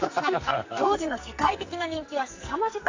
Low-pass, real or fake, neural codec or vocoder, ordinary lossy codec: 7.2 kHz; fake; codec, 44.1 kHz, 2.6 kbps, SNAC; AAC, 48 kbps